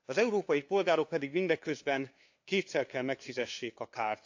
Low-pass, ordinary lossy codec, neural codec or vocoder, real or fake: 7.2 kHz; AAC, 48 kbps; codec, 16 kHz, 2 kbps, FunCodec, trained on LibriTTS, 25 frames a second; fake